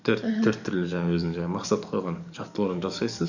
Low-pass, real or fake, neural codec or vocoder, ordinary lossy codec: 7.2 kHz; fake; codec, 44.1 kHz, 7.8 kbps, DAC; none